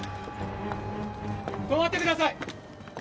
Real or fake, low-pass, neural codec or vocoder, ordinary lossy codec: real; none; none; none